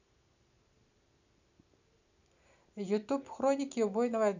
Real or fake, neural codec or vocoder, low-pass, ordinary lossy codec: real; none; 7.2 kHz; none